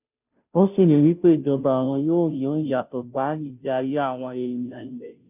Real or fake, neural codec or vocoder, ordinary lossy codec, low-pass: fake; codec, 16 kHz, 0.5 kbps, FunCodec, trained on Chinese and English, 25 frames a second; none; 3.6 kHz